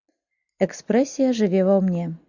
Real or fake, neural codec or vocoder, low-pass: real; none; 7.2 kHz